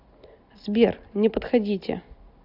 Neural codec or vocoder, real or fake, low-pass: none; real; 5.4 kHz